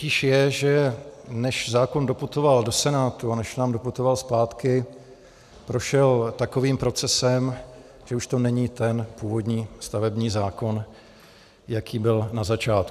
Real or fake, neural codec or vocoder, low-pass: real; none; 14.4 kHz